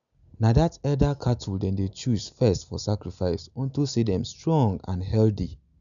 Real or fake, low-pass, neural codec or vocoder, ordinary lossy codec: real; 7.2 kHz; none; none